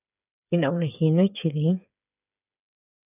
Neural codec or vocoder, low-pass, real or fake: codec, 16 kHz, 8 kbps, FreqCodec, smaller model; 3.6 kHz; fake